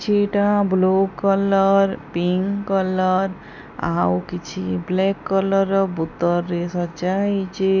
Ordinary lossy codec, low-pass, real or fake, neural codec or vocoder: none; 7.2 kHz; real; none